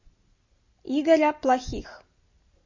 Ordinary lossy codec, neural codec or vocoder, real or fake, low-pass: MP3, 32 kbps; none; real; 7.2 kHz